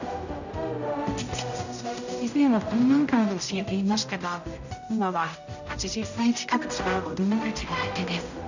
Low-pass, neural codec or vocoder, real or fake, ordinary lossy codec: 7.2 kHz; codec, 16 kHz, 0.5 kbps, X-Codec, HuBERT features, trained on general audio; fake; none